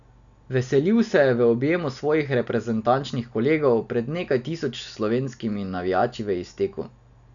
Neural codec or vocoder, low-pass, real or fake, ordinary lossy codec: none; 7.2 kHz; real; none